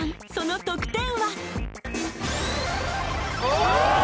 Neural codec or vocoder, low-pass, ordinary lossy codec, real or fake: none; none; none; real